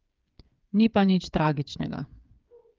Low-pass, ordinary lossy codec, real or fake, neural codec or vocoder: 7.2 kHz; Opus, 32 kbps; fake; codec, 16 kHz, 8 kbps, FreqCodec, smaller model